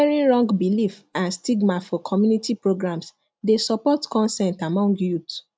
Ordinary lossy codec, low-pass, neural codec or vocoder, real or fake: none; none; none; real